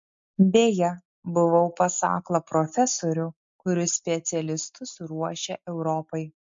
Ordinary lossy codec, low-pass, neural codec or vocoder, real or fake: MP3, 48 kbps; 7.2 kHz; none; real